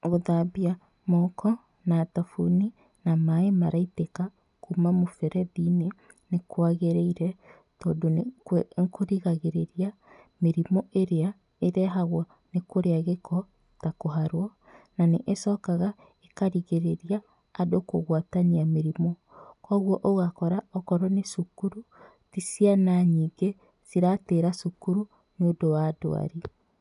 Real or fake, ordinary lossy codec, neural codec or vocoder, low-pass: real; none; none; 10.8 kHz